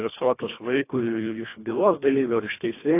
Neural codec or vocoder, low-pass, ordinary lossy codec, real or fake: codec, 24 kHz, 1.5 kbps, HILCodec; 3.6 kHz; AAC, 24 kbps; fake